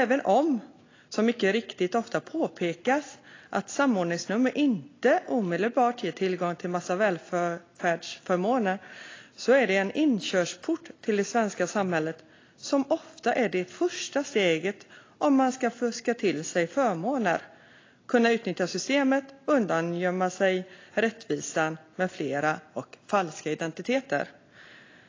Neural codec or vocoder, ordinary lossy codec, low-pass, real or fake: none; AAC, 32 kbps; 7.2 kHz; real